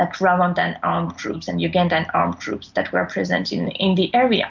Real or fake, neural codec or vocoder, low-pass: real; none; 7.2 kHz